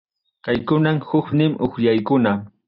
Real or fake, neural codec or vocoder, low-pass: real; none; 5.4 kHz